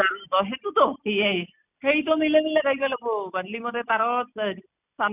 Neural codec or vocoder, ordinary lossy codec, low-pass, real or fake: none; none; 3.6 kHz; real